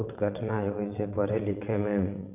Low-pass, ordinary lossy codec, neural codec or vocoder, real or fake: 3.6 kHz; none; vocoder, 22.05 kHz, 80 mel bands, WaveNeXt; fake